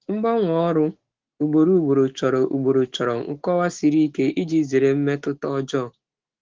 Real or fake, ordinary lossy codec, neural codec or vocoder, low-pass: fake; Opus, 16 kbps; autoencoder, 48 kHz, 128 numbers a frame, DAC-VAE, trained on Japanese speech; 7.2 kHz